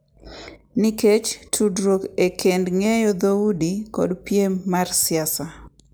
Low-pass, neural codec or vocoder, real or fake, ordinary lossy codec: none; none; real; none